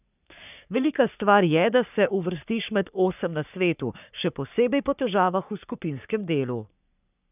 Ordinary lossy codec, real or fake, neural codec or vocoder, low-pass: none; fake; codec, 44.1 kHz, 3.4 kbps, Pupu-Codec; 3.6 kHz